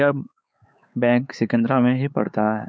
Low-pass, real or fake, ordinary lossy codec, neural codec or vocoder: none; fake; none; codec, 16 kHz, 4 kbps, X-Codec, WavLM features, trained on Multilingual LibriSpeech